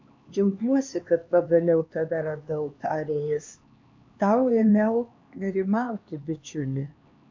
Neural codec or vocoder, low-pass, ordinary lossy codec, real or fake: codec, 16 kHz, 2 kbps, X-Codec, HuBERT features, trained on LibriSpeech; 7.2 kHz; MP3, 48 kbps; fake